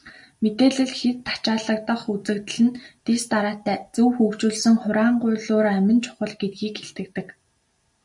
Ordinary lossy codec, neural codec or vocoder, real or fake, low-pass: MP3, 96 kbps; none; real; 10.8 kHz